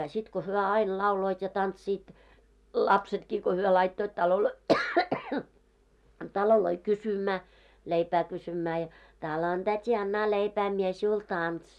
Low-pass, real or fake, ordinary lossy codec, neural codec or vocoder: none; real; none; none